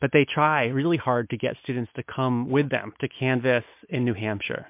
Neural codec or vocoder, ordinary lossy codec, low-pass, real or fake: none; MP3, 32 kbps; 3.6 kHz; real